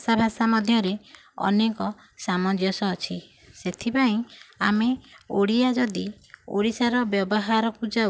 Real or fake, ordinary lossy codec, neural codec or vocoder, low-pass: real; none; none; none